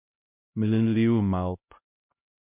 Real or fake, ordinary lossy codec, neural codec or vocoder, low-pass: fake; MP3, 32 kbps; codec, 16 kHz, 0.5 kbps, X-Codec, HuBERT features, trained on LibriSpeech; 3.6 kHz